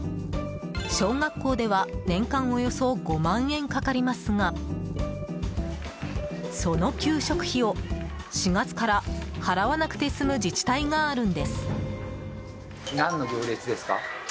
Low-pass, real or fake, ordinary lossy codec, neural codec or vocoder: none; real; none; none